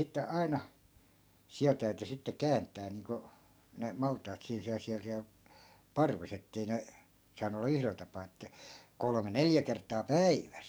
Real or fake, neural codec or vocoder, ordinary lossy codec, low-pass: fake; codec, 44.1 kHz, 7.8 kbps, DAC; none; none